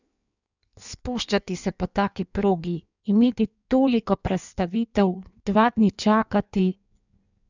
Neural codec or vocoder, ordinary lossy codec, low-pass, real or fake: codec, 16 kHz in and 24 kHz out, 1.1 kbps, FireRedTTS-2 codec; none; 7.2 kHz; fake